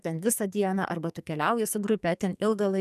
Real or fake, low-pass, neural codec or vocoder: fake; 14.4 kHz; codec, 44.1 kHz, 2.6 kbps, SNAC